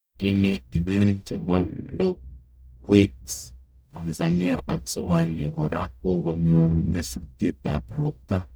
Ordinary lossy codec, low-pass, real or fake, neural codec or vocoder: none; none; fake; codec, 44.1 kHz, 0.9 kbps, DAC